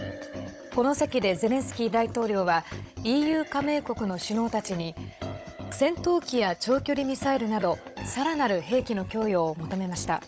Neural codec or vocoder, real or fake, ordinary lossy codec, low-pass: codec, 16 kHz, 16 kbps, FunCodec, trained on Chinese and English, 50 frames a second; fake; none; none